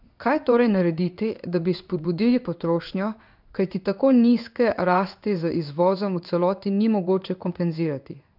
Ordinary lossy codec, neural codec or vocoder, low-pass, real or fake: none; codec, 16 kHz in and 24 kHz out, 1 kbps, XY-Tokenizer; 5.4 kHz; fake